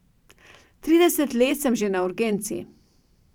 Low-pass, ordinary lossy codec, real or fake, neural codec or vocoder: 19.8 kHz; none; fake; vocoder, 44.1 kHz, 128 mel bands every 512 samples, BigVGAN v2